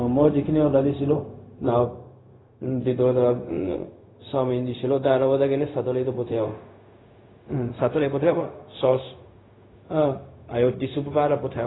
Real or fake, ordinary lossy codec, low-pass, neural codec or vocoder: fake; AAC, 16 kbps; 7.2 kHz; codec, 16 kHz, 0.4 kbps, LongCat-Audio-Codec